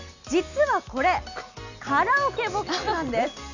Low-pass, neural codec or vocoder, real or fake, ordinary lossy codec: 7.2 kHz; none; real; none